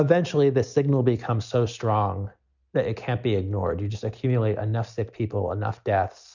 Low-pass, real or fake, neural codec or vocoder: 7.2 kHz; real; none